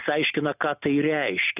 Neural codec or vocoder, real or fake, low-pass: none; real; 3.6 kHz